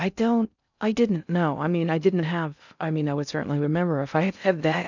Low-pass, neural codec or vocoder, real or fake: 7.2 kHz; codec, 16 kHz in and 24 kHz out, 0.6 kbps, FocalCodec, streaming, 2048 codes; fake